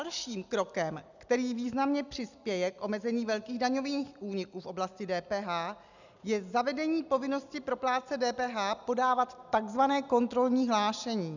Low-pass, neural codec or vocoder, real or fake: 7.2 kHz; none; real